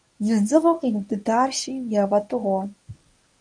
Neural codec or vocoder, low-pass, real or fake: codec, 24 kHz, 0.9 kbps, WavTokenizer, medium speech release version 1; 9.9 kHz; fake